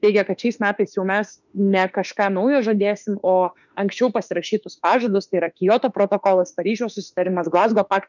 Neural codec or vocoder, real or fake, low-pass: autoencoder, 48 kHz, 32 numbers a frame, DAC-VAE, trained on Japanese speech; fake; 7.2 kHz